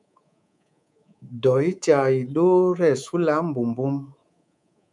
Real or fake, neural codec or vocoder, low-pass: fake; codec, 24 kHz, 3.1 kbps, DualCodec; 10.8 kHz